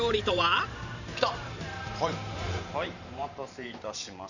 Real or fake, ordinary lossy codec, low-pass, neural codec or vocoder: fake; none; 7.2 kHz; vocoder, 44.1 kHz, 128 mel bands every 512 samples, BigVGAN v2